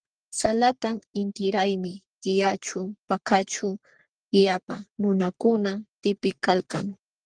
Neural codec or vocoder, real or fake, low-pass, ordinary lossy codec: codec, 44.1 kHz, 3.4 kbps, Pupu-Codec; fake; 9.9 kHz; Opus, 24 kbps